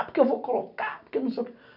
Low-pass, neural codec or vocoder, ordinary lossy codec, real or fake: 5.4 kHz; none; none; real